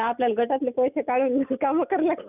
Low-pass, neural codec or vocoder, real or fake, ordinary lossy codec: 3.6 kHz; none; real; none